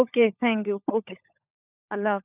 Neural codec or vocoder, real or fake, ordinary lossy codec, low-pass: codec, 16 kHz, 16 kbps, FunCodec, trained on LibriTTS, 50 frames a second; fake; AAC, 32 kbps; 3.6 kHz